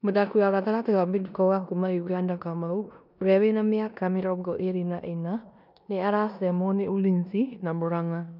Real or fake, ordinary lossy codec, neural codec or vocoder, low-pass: fake; none; codec, 16 kHz in and 24 kHz out, 0.9 kbps, LongCat-Audio-Codec, four codebook decoder; 5.4 kHz